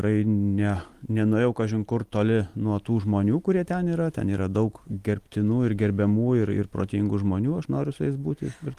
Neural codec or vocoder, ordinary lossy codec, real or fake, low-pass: none; Opus, 24 kbps; real; 14.4 kHz